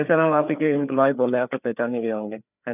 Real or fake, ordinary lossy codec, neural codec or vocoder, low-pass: fake; none; codec, 16 kHz, 4 kbps, FreqCodec, larger model; 3.6 kHz